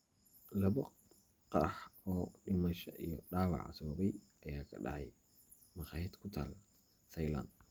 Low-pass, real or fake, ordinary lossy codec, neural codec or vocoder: 19.8 kHz; real; Opus, 32 kbps; none